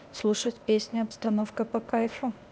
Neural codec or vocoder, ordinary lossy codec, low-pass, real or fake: codec, 16 kHz, 0.8 kbps, ZipCodec; none; none; fake